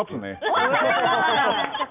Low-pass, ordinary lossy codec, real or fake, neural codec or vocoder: 3.6 kHz; none; real; none